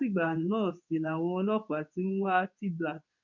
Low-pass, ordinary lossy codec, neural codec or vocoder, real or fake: 7.2 kHz; none; codec, 24 kHz, 0.9 kbps, WavTokenizer, medium speech release version 2; fake